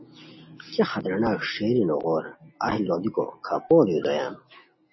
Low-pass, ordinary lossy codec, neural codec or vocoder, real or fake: 7.2 kHz; MP3, 24 kbps; none; real